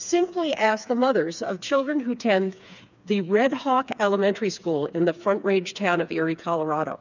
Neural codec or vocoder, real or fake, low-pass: codec, 16 kHz, 4 kbps, FreqCodec, smaller model; fake; 7.2 kHz